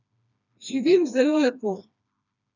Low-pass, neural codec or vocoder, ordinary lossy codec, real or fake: 7.2 kHz; codec, 16 kHz, 2 kbps, FreqCodec, smaller model; none; fake